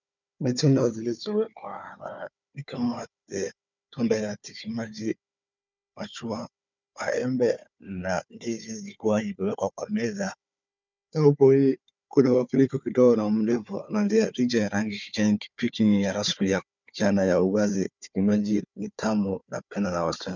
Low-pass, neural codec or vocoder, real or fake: 7.2 kHz; codec, 16 kHz, 4 kbps, FunCodec, trained on Chinese and English, 50 frames a second; fake